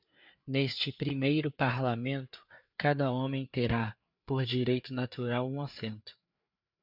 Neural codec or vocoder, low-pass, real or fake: codec, 16 kHz, 4 kbps, FreqCodec, larger model; 5.4 kHz; fake